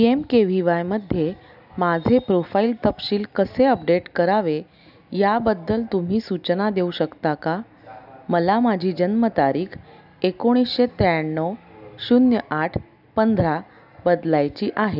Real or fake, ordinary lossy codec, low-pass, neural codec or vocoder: real; none; 5.4 kHz; none